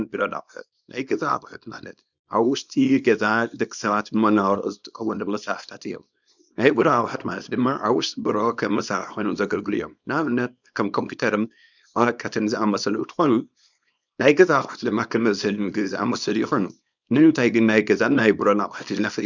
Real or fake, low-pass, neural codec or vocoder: fake; 7.2 kHz; codec, 24 kHz, 0.9 kbps, WavTokenizer, small release